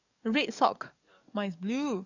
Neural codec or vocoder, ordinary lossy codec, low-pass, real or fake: codec, 44.1 kHz, 7.8 kbps, DAC; none; 7.2 kHz; fake